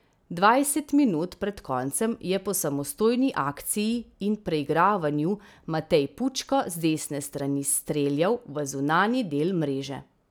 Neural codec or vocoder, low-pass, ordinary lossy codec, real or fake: none; none; none; real